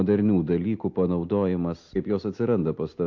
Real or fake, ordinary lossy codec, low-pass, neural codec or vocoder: real; AAC, 48 kbps; 7.2 kHz; none